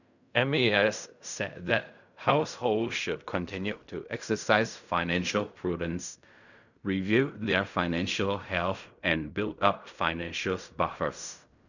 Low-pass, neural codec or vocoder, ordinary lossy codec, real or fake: 7.2 kHz; codec, 16 kHz in and 24 kHz out, 0.4 kbps, LongCat-Audio-Codec, fine tuned four codebook decoder; none; fake